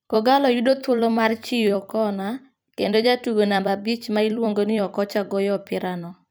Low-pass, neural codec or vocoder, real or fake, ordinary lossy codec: none; vocoder, 44.1 kHz, 128 mel bands every 256 samples, BigVGAN v2; fake; none